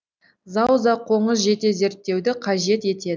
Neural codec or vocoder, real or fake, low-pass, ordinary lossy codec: none; real; none; none